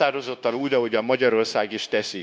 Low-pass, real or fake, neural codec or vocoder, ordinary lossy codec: none; fake; codec, 16 kHz, 0.9 kbps, LongCat-Audio-Codec; none